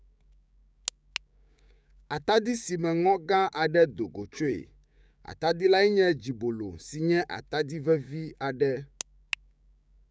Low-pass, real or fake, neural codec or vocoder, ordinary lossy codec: none; fake; codec, 16 kHz, 6 kbps, DAC; none